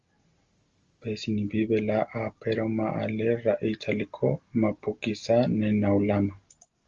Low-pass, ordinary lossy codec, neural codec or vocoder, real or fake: 7.2 kHz; Opus, 32 kbps; none; real